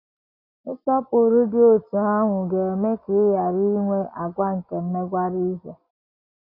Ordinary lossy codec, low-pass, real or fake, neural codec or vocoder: none; 5.4 kHz; real; none